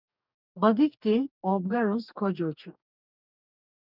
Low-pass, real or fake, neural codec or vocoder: 5.4 kHz; fake; codec, 44.1 kHz, 2.6 kbps, DAC